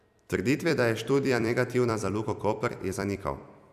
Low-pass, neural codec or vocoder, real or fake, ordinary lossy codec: 14.4 kHz; vocoder, 44.1 kHz, 128 mel bands every 256 samples, BigVGAN v2; fake; none